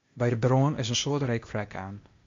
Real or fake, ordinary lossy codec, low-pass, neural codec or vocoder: fake; MP3, 48 kbps; 7.2 kHz; codec, 16 kHz, 0.8 kbps, ZipCodec